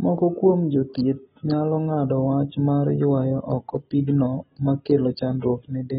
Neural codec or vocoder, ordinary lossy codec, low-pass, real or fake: none; AAC, 16 kbps; 7.2 kHz; real